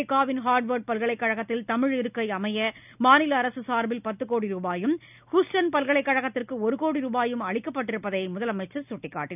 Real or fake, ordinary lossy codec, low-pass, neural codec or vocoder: real; none; 3.6 kHz; none